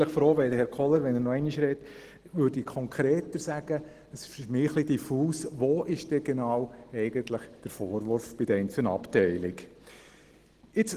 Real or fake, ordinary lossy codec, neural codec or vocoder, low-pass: real; Opus, 16 kbps; none; 14.4 kHz